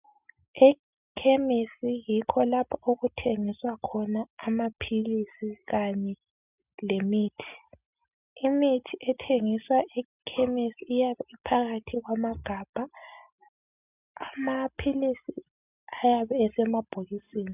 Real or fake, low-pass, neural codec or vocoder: real; 3.6 kHz; none